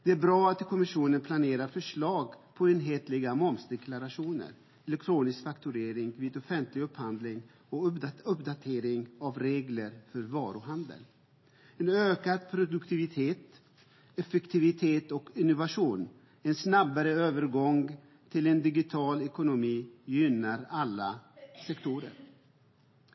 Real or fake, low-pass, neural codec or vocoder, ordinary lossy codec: real; 7.2 kHz; none; MP3, 24 kbps